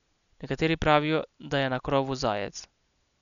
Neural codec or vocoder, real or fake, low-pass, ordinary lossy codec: none; real; 7.2 kHz; none